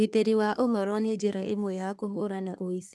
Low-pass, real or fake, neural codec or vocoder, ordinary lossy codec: none; fake; codec, 24 kHz, 1 kbps, SNAC; none